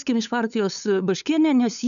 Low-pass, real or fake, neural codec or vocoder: 7.2 kHz; fake; codec, 16 kHz, 8 kbps, FunCodec, trained on LibriTTS, 25 frames a second